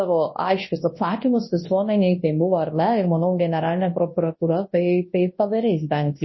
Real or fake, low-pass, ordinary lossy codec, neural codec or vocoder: fake; 7.2 kHz; MP3, 24 kbps; codec, 24 kHz, 0.9 kbps, WavTokenizer, large speech release